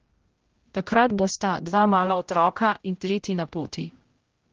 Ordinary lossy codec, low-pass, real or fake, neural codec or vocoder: Opus, 16 kbps; 7.2 kHz; fake; codec, 16 kHz, 0.5 kbps, X-Codec, HuBERT features, trained on general audio